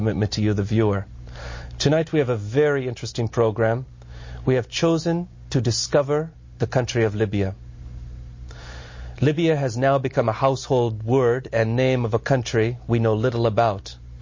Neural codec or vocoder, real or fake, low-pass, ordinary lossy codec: none; real; 7.2 kHz; MP3, 32 kbps